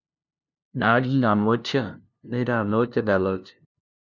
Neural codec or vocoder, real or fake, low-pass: codec, 16 kHz, 0.5 kbps, FunCodec, trained on LibriTTS, 25 frames a second; fake; 7.2 kHz